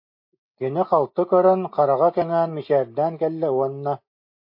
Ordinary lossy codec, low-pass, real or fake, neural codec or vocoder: MP3, 32 kbps; 5.4 kHz; real; none